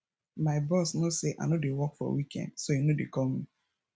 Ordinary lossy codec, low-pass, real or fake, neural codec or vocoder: none; none; real; none